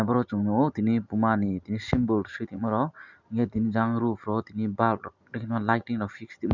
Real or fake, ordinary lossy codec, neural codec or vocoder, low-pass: real; none; none; 7.2 kHz